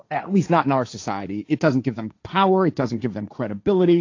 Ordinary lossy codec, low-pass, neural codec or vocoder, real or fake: AAC, 48 kbps; 7.2 kHz; codec, 16 kHz, 1.1 kbps, Voila-Tokenizer; fake